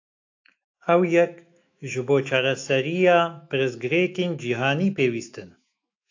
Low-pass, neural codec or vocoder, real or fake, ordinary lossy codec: 7.2 kHz; autoencoder, 48 kHz, 128 numbers a frame, DAC-VAE, trained on Japanese speech; fake; AAC, 48 kbps